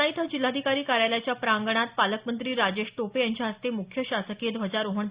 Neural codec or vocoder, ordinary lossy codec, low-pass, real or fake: none; Opus, 64 kbps; 3.6 kHz; real